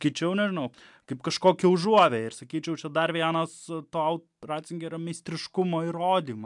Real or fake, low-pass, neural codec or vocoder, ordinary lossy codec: real; 10.8 kHz; none; MP3, 96 kbps